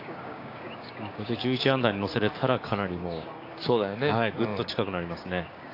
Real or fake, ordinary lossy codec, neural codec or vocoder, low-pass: fake; AAC, 32 kbps; codec, 16 kHz, 6 kbps, DAC; 5.4 kHz